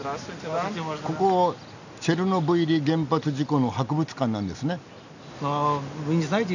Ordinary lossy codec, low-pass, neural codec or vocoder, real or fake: none; 7.2 kHz; none; real